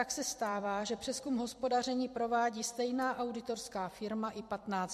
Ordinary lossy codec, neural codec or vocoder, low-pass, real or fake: MP3, 64 kbps; vocoder, 44.1 kHz, 128 mel bands every 256 samples, BigVGAN v2; 14.4 kHz; fake